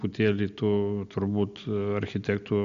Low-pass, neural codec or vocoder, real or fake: 7.2 kHz; none; real